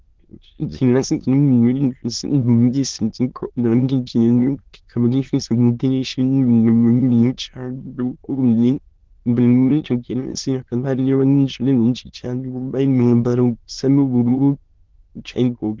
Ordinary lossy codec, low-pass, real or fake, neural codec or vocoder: Opus, 16 kbps; 7.2 kHz; fake; autoencoder, 22.05 kHz, a latent of 192 numbers a frame, VITS, trained on many speakers